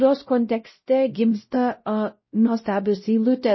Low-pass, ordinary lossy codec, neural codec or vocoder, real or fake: 7.2 kHz; MP3, 24 kbps; codec, 16 kHz, 0.5 kbps, X-Codec, WavLM features, trained on Multilingual LibriSpeech; fake